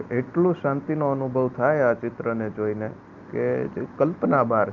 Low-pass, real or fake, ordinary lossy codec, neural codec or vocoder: 7.2 kHz; real; Opus, 24 kbps; none